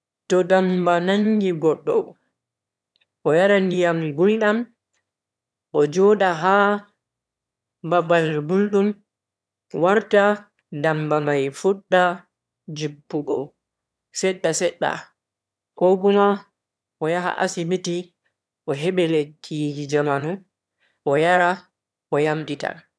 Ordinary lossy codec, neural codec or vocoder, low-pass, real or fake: none; autoencoder, 22.05 kHz, a latent of 192 numbers a frame, VITS, trained on one speaker; none; fake